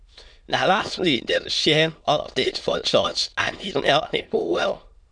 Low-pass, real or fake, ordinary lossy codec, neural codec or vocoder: 9.9 kHz; fake; none; autoencoder, 22.05 kHz, a latent of 192 numbers a frame, VITS, trained on many speakers